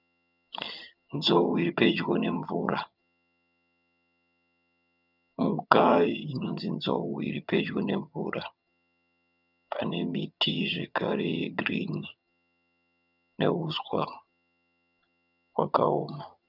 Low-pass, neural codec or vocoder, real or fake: 5.4 kHz; vocoder, 22.05 kHz, 80 mel bands, HiFi-GAN; fake